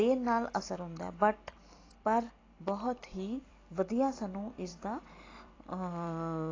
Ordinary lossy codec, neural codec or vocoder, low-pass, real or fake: AAC, 32 kbps; vocoder, 44.1 kHz, 128 mel bands every 256 samples, BigVGAN v2; 7.2 kHz; fake